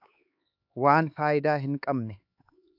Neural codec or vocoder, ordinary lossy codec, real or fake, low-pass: codec, 16 kHz, 4 kbps, X-Codec, HuBERT features, trained on LibriSpeech; AAC, 48 kbps; fake; 5.4 kHz